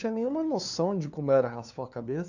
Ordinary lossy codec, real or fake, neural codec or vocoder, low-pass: none; fake; codec, 16 kHz, 2 kbps, FunCodec, trained on LibriTTS, 25 frames a second; 7.2 kHz